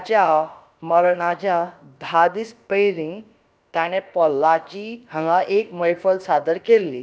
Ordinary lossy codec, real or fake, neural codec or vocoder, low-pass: none; fake; codec, 16 kHz, about 1 kbps, DyCAST, with the encoder's durations; none